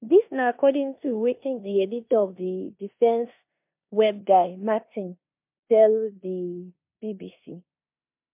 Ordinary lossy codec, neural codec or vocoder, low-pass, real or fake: MP3, 32 kbps; codec, 16 kHz in and 24 kHz out, 0.9 kbps, LongCat-Audio-Codec, four codebook decoder; 3.6 kHz; fake